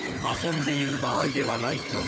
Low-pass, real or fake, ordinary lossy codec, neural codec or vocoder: none; fake; none; codec, 16 kHz, 4 kbps, FunCodec, trained on Chinese and English, 50 frames a second